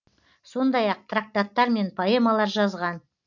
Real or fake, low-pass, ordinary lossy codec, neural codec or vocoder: real; 7.2 kHz; none; none